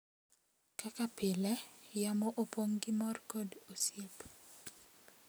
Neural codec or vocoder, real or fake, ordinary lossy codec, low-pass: none; real; none; none